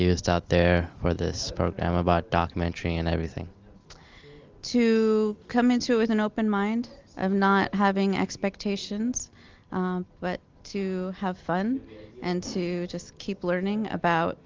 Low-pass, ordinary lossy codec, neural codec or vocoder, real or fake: 7.2 kHz; Opus, 32 kbps; none; real